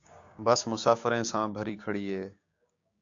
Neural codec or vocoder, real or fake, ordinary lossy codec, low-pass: codec, 16 kHz, 6 kbps, DAC; fake; AAC, 48 kbps; 7.2 kHz